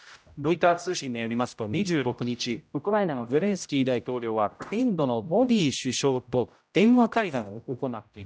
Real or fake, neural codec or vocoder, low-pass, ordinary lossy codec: fake; codec, 16 kHz, 0.5 kbps, X-Codec, HuBERT features, trained on general audio; none; none